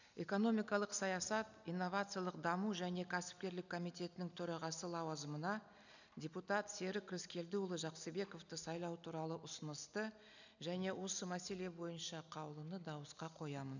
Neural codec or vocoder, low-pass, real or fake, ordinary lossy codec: none; 7.2 kHz; real; none